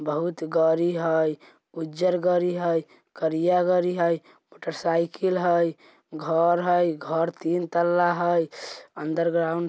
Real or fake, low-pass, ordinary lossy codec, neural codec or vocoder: real; none; none; none